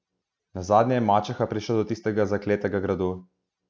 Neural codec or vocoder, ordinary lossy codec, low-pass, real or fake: none; none; none; real